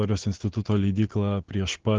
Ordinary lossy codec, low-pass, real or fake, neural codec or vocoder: Opus, 16 kbps; 7.2 kHz; real; none